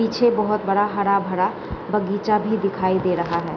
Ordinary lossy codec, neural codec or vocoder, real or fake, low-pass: none; none; real; 7.2 kHz